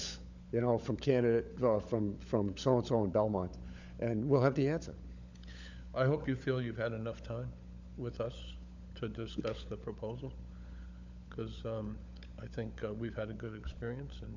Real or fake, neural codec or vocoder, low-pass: fake; codec, 16 kHz, 16 kbps, FunCodec, trained on LibriTTS, 50 frames a second; 7.2 kHz